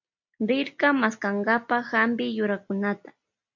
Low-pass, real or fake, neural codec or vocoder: 7.2 kHz; real; none